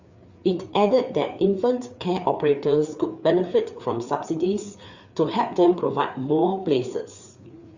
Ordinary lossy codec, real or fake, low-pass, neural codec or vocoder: Opus, 64 kbps; fake; 7.2 kHz; codec, 16 kHz, 4 kbps, FreqCodec, larger model